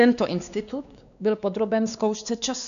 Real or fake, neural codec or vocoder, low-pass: fake; codec, 16 kHz, 2 kbps, X-Codec, WavLM features, trained on Multilingual LibriSpeech; 7.2 kHz